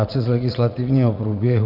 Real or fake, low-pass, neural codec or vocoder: fake; 5.4 kHz; vocoder, 44.1 kHz, 80 mel bands, Vocos